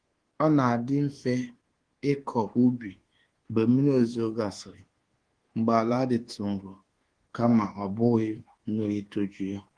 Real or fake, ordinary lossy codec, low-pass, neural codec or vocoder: fake; Opus, 16 kbps; 9.9 kHz; codec, 24 kHz, 1.2 kbps, DualCodec